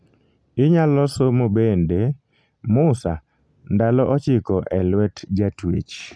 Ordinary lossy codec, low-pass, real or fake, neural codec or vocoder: none; none; real; none